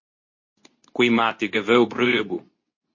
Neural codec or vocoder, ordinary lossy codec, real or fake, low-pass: codec, 24 kHz, 0.9 kbps, WavTokenizer, medium speech release version 2; MP3, 32 kbps; fake; 7.2 kHz